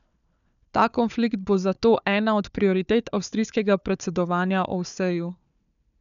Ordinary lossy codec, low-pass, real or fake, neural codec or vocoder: none; 7.2 kHz; fake; codec, 16 kHz, 4 kbps, FunCodec, trained on Chinese and English, 50 frames a second